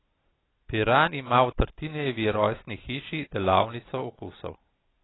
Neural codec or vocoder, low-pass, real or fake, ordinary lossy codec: none; 7.2 kHz; real; AAC, 16 kbps